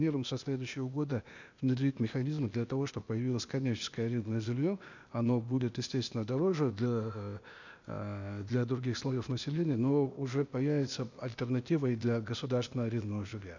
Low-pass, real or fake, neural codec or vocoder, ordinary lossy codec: 7.2 kHz; fake; codec, 16 kHz, 0.8 kbps, ZipCodec; none